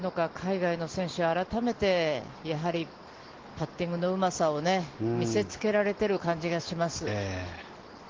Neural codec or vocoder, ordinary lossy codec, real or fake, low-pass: none; Opus, 16 kbps; real; 7.2 kHz